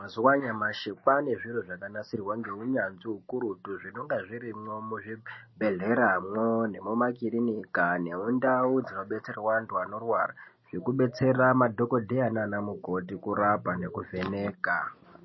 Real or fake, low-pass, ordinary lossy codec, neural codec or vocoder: real; 7.2 kHz; MP3, 24 kbps; none